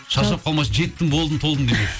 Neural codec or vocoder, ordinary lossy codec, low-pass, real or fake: none; none; none; real